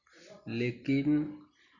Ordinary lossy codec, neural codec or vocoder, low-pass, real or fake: none; none; 7.2 kHz; real